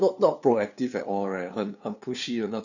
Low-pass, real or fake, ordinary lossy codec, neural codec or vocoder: 7.2 kHz; fake; none; codec, 16 kHz, 2 kbps, FunCodec, trained on LibriTTS, 25 frames a second